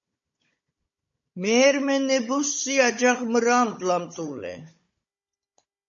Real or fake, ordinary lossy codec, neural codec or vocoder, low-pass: fake; MP3, 32 kbps; codec, 16 kHz, 16 kbps, FunCodec, trained on Chinese and English, 50 frames a second; 7.2 kHz